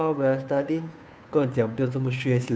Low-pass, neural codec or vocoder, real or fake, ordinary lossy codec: none; codec, 16 kHz, 2 kbps, FunCodec, trained on Chinese and English, 25 frames a second; fake; none